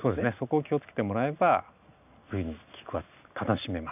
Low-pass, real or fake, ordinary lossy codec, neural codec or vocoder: 3.6 kHz; real; none; none